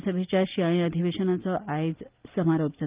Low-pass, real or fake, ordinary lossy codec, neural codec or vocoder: 3.6 kHz; real; Opus, 32 kbps; none